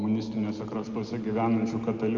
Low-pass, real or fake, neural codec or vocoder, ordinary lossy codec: 7.2 kHz; real; none; Opus, 24 kbps